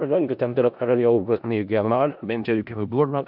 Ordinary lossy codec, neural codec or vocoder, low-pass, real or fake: AAC, 48 kbps; codec, 16 kHz in and 24 kHz out, 0.4 kbps, LongCat-Audio-Codec, four codebook decoder; 5.4 kHz; fake